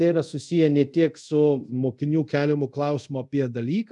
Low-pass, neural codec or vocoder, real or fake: 10.8 kHz; codec, 24 kHz, 0.5 kbps, DualCodec; fake